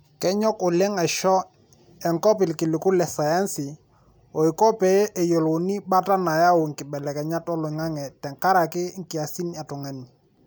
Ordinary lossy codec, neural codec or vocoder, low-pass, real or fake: none; none; none; real